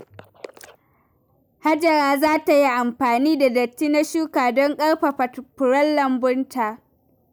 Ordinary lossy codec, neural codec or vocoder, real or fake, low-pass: none; none; real; none